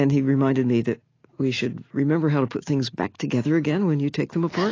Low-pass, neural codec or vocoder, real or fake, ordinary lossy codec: 7.2 kHz; none; real; AAC, 32 kbps